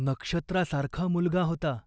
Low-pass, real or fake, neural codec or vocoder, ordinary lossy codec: none; real; none; none